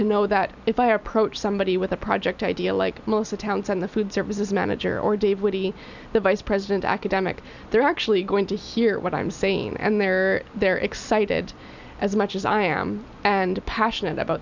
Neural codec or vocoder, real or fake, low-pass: none; real; 7.2 kHz